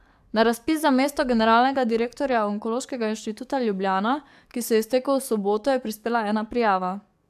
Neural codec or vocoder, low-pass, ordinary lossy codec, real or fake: codec, 44.1 kHz, 7.8 kbps, DAC; 14.4 kHz; AAC, 96 kbps; fake